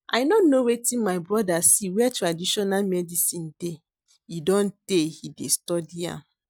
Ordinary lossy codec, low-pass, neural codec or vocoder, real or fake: none; none; none; real